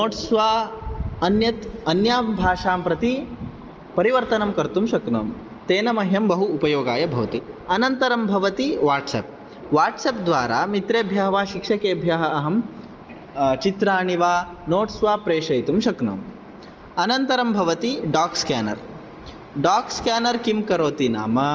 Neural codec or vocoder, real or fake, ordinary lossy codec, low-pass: none; real; Opus, 24 kbps; 7.2 kHz